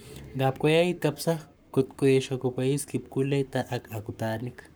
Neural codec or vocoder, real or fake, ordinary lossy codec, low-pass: codec, 44.1 kHz, 7.8 kbps, Pupu-Codec; fake; none; none